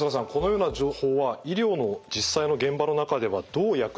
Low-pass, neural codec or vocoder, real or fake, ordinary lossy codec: none; none; real; none